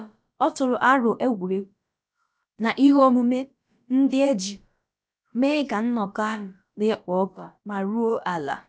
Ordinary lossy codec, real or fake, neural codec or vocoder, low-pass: none; fake; codec, 16 kHz, about 1 kbps, DyCAST, with the encoder's durations; none